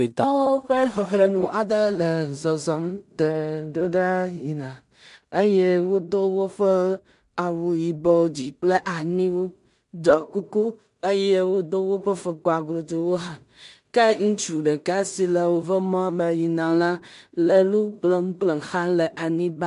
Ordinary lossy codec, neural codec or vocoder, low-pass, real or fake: MP3, 64 kbps; codec, 16 kHz in and 24 kHz out, 0.4 kbps, LongCat-Audio-Codec, two codebook decoder; 10.8 kHz; fake